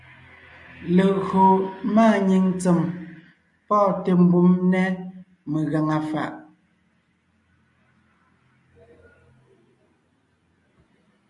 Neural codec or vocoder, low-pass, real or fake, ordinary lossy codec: none; 10.8 kHz; real; MP3, 64 kbps